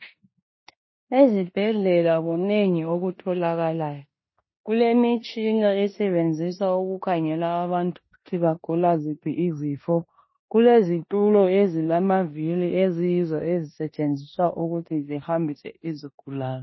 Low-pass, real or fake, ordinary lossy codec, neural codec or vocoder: 7.2 kHz; fake; MP3, 24 kbps; codec, 16 kHz in and 24 kHz out, 0.9 kbps, LongCat-Audio-Codec, four codebook decoder